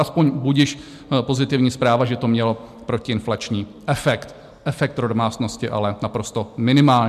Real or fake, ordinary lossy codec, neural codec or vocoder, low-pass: real; MP3, 96 kbps; none; 14.4 kHz